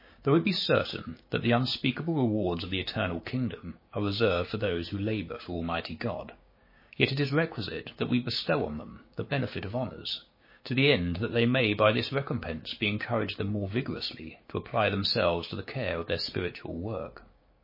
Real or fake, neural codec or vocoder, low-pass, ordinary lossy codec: fake; codec, 16 kHz, 6 kbps, DAC; 5.4 kHz; MP3, 24 kbps